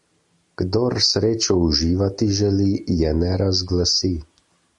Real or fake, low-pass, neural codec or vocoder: real; 10.8 kHz; none